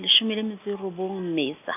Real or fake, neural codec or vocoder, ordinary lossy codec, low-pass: real; none; none; 3.6 kHz